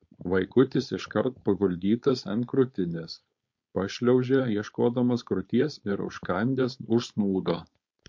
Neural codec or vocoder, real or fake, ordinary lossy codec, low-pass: codec, 16 kHz, 4.8 kbps, FACodec; fake; MP3, 48 kbps; 7.2 kHz